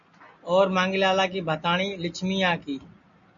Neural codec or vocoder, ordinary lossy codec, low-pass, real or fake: none; MP3, 64 kbps; 7.2 kHz; real